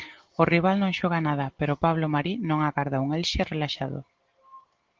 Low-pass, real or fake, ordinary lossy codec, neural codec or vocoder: 7.2 kHz; real; Opus, 16 kbps; none